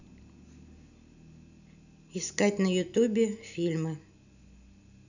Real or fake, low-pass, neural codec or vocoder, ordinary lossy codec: real; 7.2 kHz; none; none